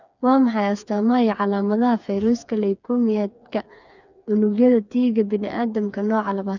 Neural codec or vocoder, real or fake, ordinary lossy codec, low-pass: codec, 16 kHz, 4 kbps, FreqCodec, smaller model; fake; none; 7.2 kHz